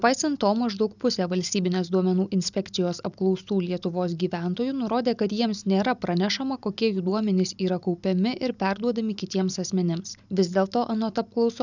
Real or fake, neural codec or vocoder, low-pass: real; none; 7.2 kHz